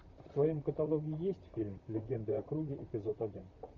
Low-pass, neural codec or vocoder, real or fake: 7.2 kHz; vocoder, 44.1 kHz, 128 mel bands, Pupu-Vocoder; fake